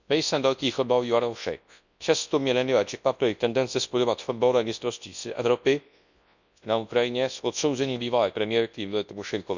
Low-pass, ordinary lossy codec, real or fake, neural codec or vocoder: 7.2 kHz; none; fake; codec, 24 kHz, 0.9 kbps, WavTokenizer, large speech release